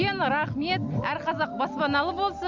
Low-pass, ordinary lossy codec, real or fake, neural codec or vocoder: 7.2 kHz; none; real; none